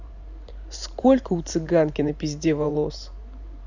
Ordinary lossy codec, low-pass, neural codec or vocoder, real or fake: none; 7.2 kHz; vocoder, 44.1 kHz, 128 mel bands every 512 samples, BigVGAN v2; fake